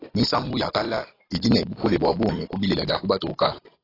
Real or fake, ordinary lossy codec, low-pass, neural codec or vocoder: real; AAC, 24 kbps; 5.4 kHz; none